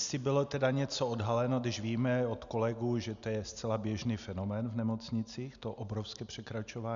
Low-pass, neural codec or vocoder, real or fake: 7.2 kHz; none; real